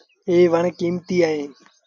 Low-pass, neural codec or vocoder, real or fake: 7.2 kHz; none; real